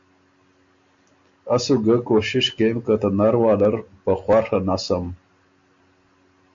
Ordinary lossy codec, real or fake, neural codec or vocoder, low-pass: MP3, 48 kbps; real; none; 7.2 kHz